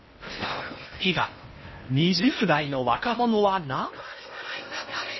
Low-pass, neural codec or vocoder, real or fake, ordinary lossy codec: 7.2 kHz; codec, 16 kHz in and 24 kHz out, 0.6 kbps, FocalCodec, streaming, 4096 codes; fake; MP3, 24 kbps